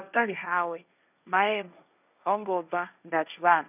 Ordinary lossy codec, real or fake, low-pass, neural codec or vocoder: none; fake; 3.6 kHz; codec, 16 kHz, 1.1 kbps, Voila-Tokenizer